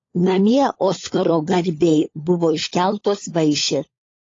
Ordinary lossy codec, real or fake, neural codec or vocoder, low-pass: AAC, 32 kbps; fake; codec, 16 kHz, 16 kbps, FunCodec, trained on LibriTTS, 50 frames a second; 7.2 kHz